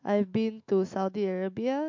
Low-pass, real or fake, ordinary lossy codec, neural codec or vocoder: 7.2 kHz; fake; MP3, 48 kbps; autoencoder, 48 kHz, 128 numbers a frame, DAC-VAE, trained on Japanese speech